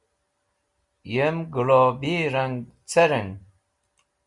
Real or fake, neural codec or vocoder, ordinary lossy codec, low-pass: real; none; Opus, 64 kbps; 10.8 kHz